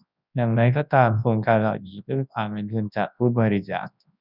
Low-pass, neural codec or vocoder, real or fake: 5.4 kHz; codec, 24 kHz, 0.9 kbps, WavTokenizer, large speech release; fake